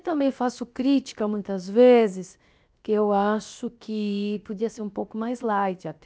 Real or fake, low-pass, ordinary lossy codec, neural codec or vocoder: fake; none; none; codec, 16 kHz, 0.7 kbps, FocalCodec